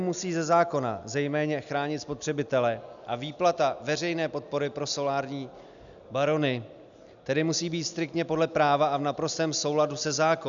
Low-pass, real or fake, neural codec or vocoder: 7.2 kHz; real; none